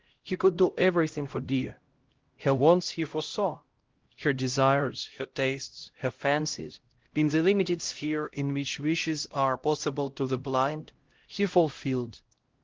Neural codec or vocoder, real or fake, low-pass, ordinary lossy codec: codec, 16 kHz, 0.5 kbps, X-Codec, HuBERT features, trained on LibriSpeech; fake; 7.2 kHz; Opus, 16 kbps